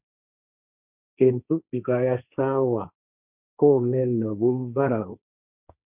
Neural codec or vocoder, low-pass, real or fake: codec, 16 kHz, 1.1 kbps, Voila-Tokenizer; 3.6 kHz; fake